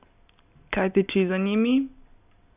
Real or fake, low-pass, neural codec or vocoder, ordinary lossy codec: real; 3.6 kHz; none; none